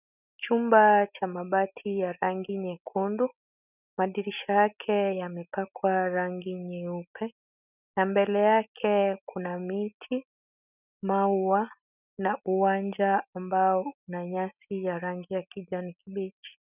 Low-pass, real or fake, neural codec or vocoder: 3.6 kHz; real; none